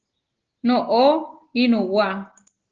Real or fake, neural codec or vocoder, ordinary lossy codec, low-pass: real; none; Opus, 16 kbps; 7.2 kHz